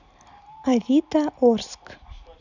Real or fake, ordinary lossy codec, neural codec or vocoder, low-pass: real; none; none; 7.2 kHz